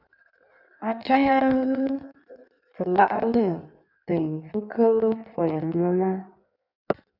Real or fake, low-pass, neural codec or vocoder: fake; 5.4 kHz; codec, 16 kHz in and 24 kHz out, 1.1 kbps, FireRedTTS-2 codec